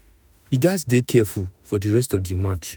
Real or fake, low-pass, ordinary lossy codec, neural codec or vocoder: fake; none; none; autoencoder, 48 kHz, 32 numbers a frame, DAC-VAE, trained on Japanese speech